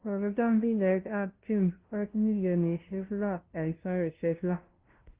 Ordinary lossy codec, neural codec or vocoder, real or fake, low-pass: Opus, 16 kbps; codec, 16 kHz, 0.5 kbps, FunCodec, trained on LibriTTS, 25 frames a second; fake; 3.6 kHz